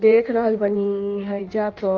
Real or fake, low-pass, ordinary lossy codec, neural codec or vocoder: fake; 7.2 kHz; Opus, 32 kbps; codec, 16 kHz in and 24 kHz out, 1.1 kbps, FireRedTTS-2 codec